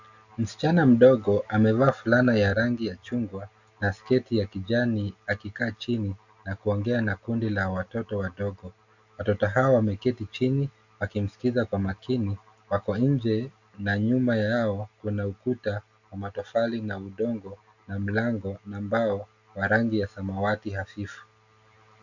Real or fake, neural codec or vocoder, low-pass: real; none; 7.2 kHz